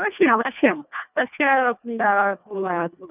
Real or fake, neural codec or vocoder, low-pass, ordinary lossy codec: fake; codec, 24 kHz, 1.5 kbps, HILCodec; 3.6 kHz; none